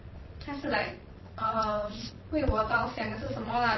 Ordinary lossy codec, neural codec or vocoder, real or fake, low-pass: MP3, 24 kbps; vocoder, 22.05 kHz, 80 mel bands, Vocos; fake; 7.2 kHz